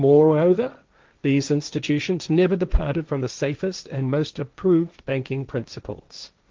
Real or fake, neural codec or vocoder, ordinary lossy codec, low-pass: fake; codec, 16 kHz, 1.1 kbps, Voila-Tokenizer; Opus, 24 kbps; 7.2 kHz